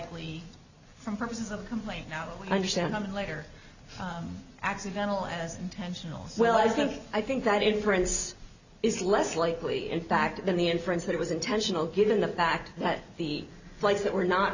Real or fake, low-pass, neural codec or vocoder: real; 7.2 kHz; none